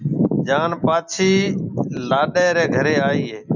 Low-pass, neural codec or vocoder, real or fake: 7.2 kHz; none; real